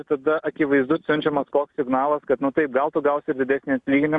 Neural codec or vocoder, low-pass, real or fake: none; 10.8 kHz; real